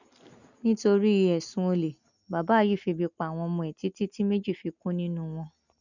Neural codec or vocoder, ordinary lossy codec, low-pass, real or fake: none; none; 7.2 kHz; real